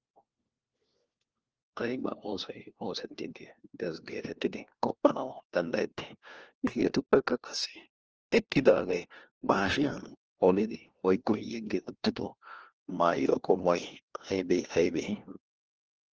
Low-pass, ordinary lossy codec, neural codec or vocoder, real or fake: 7.2 kHz; Opus, 16 kbps; codec, 16 kHz, 1 kbps, FunCodec, trained on LibriTTS, 50 frames a second; fake